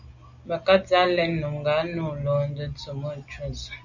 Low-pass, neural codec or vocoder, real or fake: 7.2 kHz; none; real